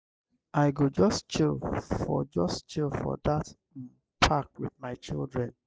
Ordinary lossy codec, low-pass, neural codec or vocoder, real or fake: none; none; none; real